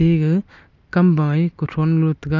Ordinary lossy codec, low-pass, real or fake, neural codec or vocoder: none; 7.2 kHz; real; none